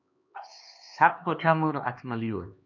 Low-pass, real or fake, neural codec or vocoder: 7.2 kHz; fake; codec, 16 kHz, 2 kbps, X-Codec, HuBERT features, trained on LibriSpeech